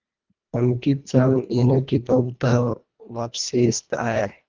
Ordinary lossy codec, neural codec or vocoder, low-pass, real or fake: Opus, 24 kbps; codec, 24 kHz, 1.5 kbps, HILCodec; 7.2 kHz; fake